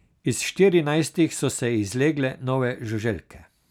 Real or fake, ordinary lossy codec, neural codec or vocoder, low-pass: real; none; none; 19.8 kHz